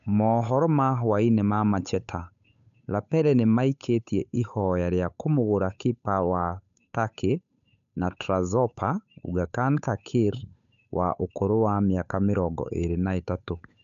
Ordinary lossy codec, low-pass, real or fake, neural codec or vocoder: none; 7.2 kHz; fake; codec, 16 kHz, 8 kbps, FunCodec, trained on Chinese and English, 25 frames a second